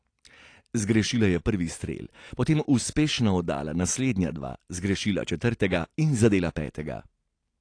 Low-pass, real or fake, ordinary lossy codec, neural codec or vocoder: 9.9 kHz; real; AAC, 48 kbps; none